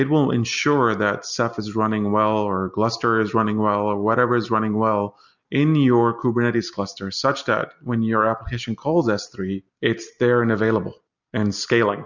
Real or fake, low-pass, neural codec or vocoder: real; 7.2 kHz; none